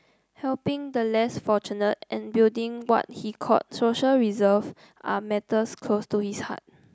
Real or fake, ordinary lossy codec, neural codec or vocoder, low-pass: real; none; none; none